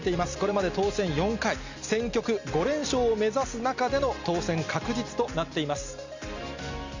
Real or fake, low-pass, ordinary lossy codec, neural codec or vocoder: real; 7.2 kHz; Opus, 64 kbps; none